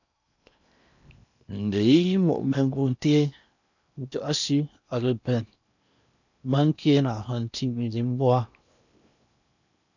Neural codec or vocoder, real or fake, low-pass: codec, 16 kHz in and 24 kHz out, 0.8 kbps, FocalCodec, streaming, 65536 codes; fake; 7.2 kHz